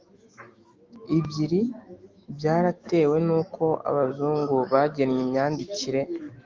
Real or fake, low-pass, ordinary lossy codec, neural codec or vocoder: fake; 7.2 kHz; Opus, 32 kbps; autoencoder, 48 kHz, 128 numbers a frame, DAC-VAE, trained on Japanese speech